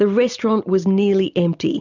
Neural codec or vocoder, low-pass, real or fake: none; 7.2 kHz; real